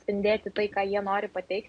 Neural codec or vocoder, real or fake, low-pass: none; real; 9.9 kHz